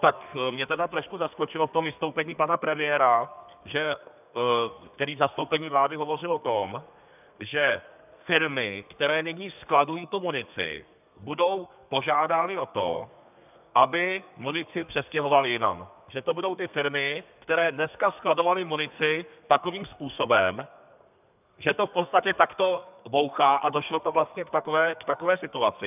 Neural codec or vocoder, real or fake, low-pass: codec, 32 kHz, 1.9 kbps, SNAC; fake; 3.6 kHz